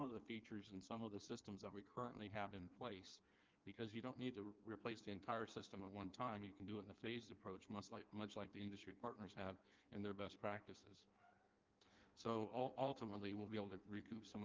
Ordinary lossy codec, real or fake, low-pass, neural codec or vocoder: Opus, 24 kbps; fake; 7.2 kHz; codec, 16 kHz in and 24 kHz out, 1.1 kbps, FireRedTTS-2 codec